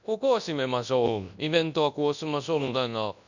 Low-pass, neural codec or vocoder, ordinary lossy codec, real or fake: 7.2 kHz; codec, 24 kHz, 0.9 kbps, WavTokenizer, large speech release; none; fake